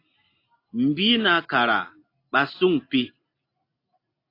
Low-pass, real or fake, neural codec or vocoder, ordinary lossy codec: 5.4 kHz; real; none; AAC, 32 kbps